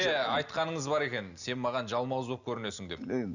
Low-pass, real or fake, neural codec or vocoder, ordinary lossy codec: 7.2 kHz; real; none; none